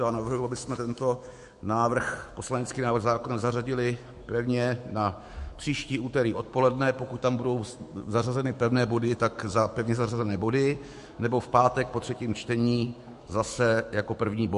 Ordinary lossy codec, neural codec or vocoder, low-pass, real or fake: MP3, 48 kbps; autoencoder, 48 kHz, 128 numbers a frame, DAC-VAE, trained on Japanese speech; 14.4 kHz; fake